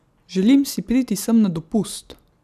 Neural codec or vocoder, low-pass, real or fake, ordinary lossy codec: none; 14.4 kHz; real; none